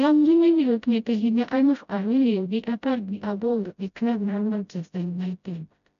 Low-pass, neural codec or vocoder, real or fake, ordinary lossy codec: 7.2 kHz; codec, 16 kHz, 0.5 kbps, FreqCodec, smaller model; fake; none